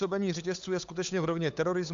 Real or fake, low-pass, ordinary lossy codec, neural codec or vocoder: fake; 7.2 kHz; AAC, 64 kbps; codec, 16 kHz, 8 kbps, FunCodec, trained on Chinese and English, 25 frames a second